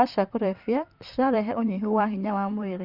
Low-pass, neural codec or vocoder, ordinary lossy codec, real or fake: 5.4 kHz; vocoder, 44.1 kHz, 128 mel bands, Pupu-Vocoder; Opus, 64 kbps; fake